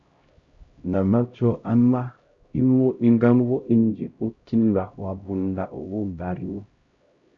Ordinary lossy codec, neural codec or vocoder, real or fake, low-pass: Opus, 64 kbps; codec, 16 kHz, 0.5 kbps, X-Codec, HuBERT features, trained on LibriSpeech; fake; 7.2 kHz